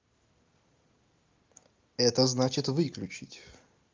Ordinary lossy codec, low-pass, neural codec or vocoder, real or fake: Opus, 32 kbps; 7.2 kHz; none; real